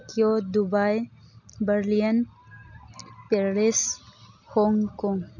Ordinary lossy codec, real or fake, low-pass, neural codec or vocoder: none; real; 7.2 kHz; none